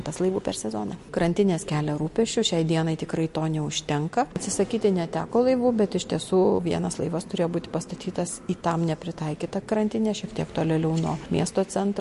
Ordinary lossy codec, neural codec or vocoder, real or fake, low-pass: MP3, 48 kbps; none; real; 14.4 kHz